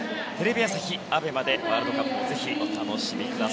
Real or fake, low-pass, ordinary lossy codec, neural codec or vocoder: real; none; none; none